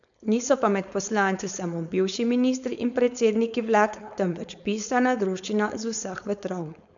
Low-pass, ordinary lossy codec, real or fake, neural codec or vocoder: 7.2 kHz; none; fake; codec, 16 kHz, 4.8 kbps, FACodec